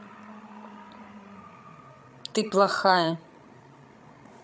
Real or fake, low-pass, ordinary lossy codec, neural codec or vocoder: fake; none; none; codec, 16 kHz, 16 kbps, FreqCodec, larger model